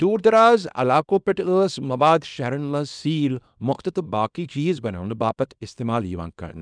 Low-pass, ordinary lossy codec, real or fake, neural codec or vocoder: 9.9 kHz; none; fake; codec, 24 kHz, 0.9 kbps, WavTokenizer, small release